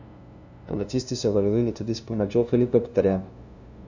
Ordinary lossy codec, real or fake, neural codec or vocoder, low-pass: none; fake; codec, 16 kHz, 0.5 kbps, FunCodec, trained on LibriTTS, 25 frames a second; 7.2 kHz